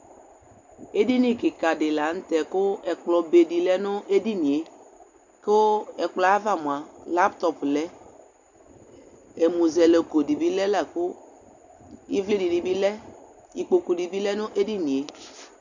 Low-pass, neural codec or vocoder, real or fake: 7.2 kHz; none; real